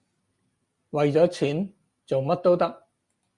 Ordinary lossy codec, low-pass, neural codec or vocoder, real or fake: Opus, 64 kbps; 10.8 kHz; none; real